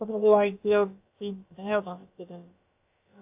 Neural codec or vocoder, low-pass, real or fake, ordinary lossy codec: codec, 16 kHz, about 1 kbps, DyCAST, with the encoder's durations; 3.6 kHz; fake; AAC, 32 kbps